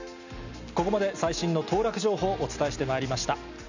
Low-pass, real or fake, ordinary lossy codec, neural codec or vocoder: 7.2 kHz; real; none; none